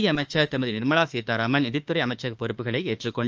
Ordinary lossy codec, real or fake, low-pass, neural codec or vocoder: Opus, 24 kbps; fake; 7.2 kHz; autoencoder, 48 kHz, 32 numbers a frame, DAC-VAE, trained on Japanese speech